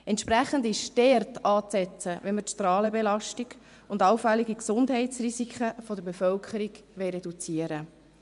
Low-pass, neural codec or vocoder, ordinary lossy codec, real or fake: 10.8 kHz; vocoder, 24 kHz, 100 mel bands, Vocos; none; fake